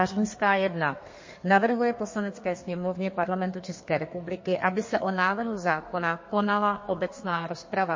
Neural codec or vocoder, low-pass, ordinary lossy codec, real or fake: codec, 32 kHz, 1.9 kbps, SNAC; 7.2 kHz; MP3, 32 kbps; fake